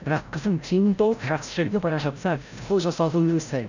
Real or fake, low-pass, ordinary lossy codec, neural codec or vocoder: fake; 7.2 kHz; none; codec, 16 kHz, 0.5 kbps, FreqCodec, larger model